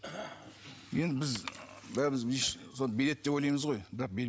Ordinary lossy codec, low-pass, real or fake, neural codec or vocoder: none; none; real; none